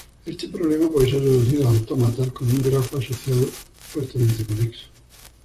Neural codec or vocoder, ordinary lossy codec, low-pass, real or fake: vocoder, 44.1 kHz, 128 mel bands, Pupu-Vocoder; Opus, 64 kbps; 14.4 kHz; fake